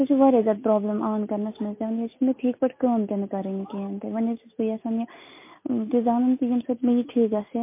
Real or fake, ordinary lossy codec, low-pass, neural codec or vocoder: real; MP3, 24 kbps; 3.6 kHz; none